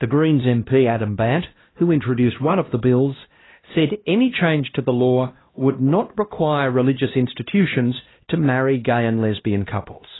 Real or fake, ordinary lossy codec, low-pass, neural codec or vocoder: fake; AAC, 16 kbps; 7.2 kHz; codec, 16 kHz, 1 kbps, X-Codec, HuBERT features, trained on LibriSpeech